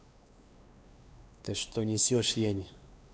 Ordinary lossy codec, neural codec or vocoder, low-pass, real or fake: none; codec, 16 kHz, 2 kbps, X-Codec, WavLM features, trained on Multilingual LibriSpeech; none; fake